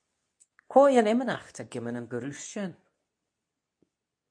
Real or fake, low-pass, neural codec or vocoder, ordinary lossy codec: fake; 9.9 kHz; codec, 24 kHz, 0.9 kbps, WavTokenizer, medium speech release version 2; MP3, 64 kbps